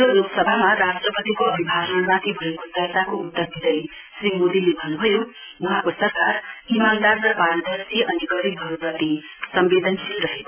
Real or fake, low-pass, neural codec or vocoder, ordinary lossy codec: real; 3.6 kHz; none; AAC, 32 kbps